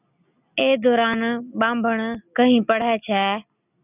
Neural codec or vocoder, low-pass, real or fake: none; 3.6 kHz; real